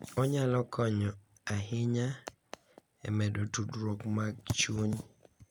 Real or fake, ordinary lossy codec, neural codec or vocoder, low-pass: real; none; none; none